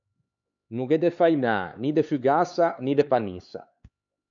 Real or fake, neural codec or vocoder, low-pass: fake; codec, 16 kHz, 4 kbps, X-Codec, HuBERT features, trained on LibriSpeech; 7.2 kHz